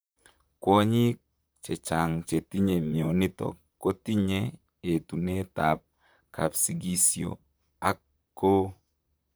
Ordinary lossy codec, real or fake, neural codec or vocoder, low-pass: none; fake; vocoder, 44.1 kHz, 128 mel bands, Pupu-Vocoder; none